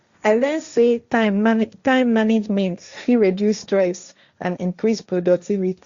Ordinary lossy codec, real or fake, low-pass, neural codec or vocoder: Opus, 64 kbps; fake; 7.2 kHz; codec, 16 kHz, 1.1 kbps, Voila-Tokenizer